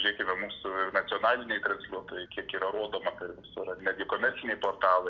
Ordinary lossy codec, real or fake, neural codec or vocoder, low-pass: MP3, 48 kbps; real; none; 7.2 kHz